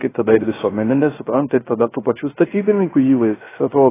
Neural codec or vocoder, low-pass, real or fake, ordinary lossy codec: codec, 16 kHz, 0.3 kbps, FocalCodec; 3.6 kHz; fake; AAC, 16 kbps